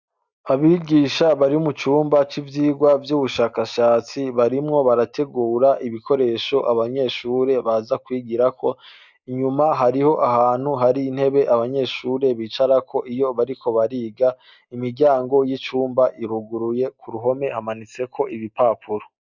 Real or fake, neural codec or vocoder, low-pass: real; none; 7.2 kHz